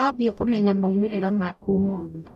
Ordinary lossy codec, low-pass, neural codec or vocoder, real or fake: none; 14.4 kHz; codec, 44.1 kHz, 0.9 kbps, DAC; fake